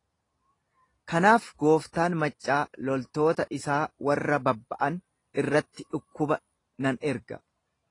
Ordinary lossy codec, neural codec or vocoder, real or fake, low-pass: AAC, 32 kbps; none; real; 10.8 kHz